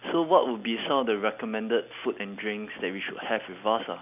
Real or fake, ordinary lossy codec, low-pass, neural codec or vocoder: real; none; 3.6 kHz; none